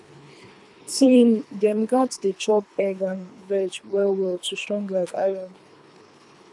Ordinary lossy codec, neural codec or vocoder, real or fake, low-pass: none; codec, 24 kHz, 3 kbps, HILCodec; fake; none